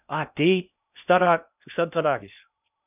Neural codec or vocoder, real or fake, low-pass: codec, 16 kHz in and 24 kHz out, 0.6 kbps, FocalCodec, streaming, 2048 codes; fake; 3.6 kHz